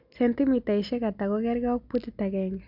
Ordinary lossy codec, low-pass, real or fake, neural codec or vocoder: none; 5.4 kHz; real; none